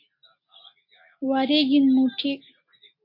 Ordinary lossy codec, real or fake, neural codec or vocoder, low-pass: MP3, 32 kbps; real; none; 5.4 kHz